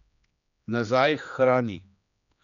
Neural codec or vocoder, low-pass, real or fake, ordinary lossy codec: codec, 16 kHz, 2 kbps, X-Codec, HuBERT features, trained on general audio; 7.2 kHz; fake; none